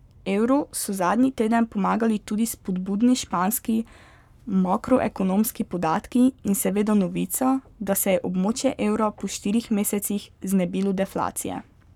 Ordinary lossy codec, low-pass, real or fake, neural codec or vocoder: none; 19.8 kHz; fake; codec, 44.1 kHz, 7.8 kbps, Pupu-Codec